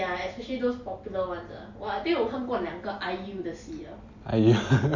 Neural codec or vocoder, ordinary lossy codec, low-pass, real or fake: none; Opus, 64 kbps; 7.2 kHz; real